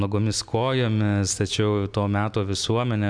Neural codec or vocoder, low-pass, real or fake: none; 9.9 kHz; real